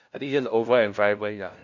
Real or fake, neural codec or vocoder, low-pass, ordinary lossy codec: fake; codec, 16 kHz, 0.5 kbps, FunCodec, trained on LibriTTS, 25 frames a second; 7.2 kHz; none